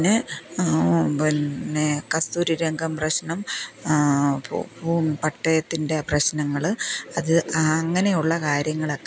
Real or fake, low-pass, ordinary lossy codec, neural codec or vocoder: real; none; none; none